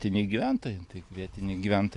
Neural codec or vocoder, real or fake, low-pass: none; real; 10.8 kHz